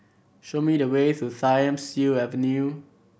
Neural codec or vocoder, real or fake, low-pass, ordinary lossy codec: none; real; none; none